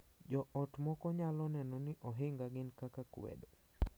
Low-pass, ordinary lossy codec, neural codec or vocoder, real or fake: none; none; none; real